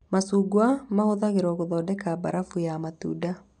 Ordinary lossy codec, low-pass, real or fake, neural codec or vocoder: none; 9.9 kHz; real; none